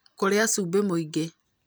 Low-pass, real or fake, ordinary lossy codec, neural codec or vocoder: none; real; none; none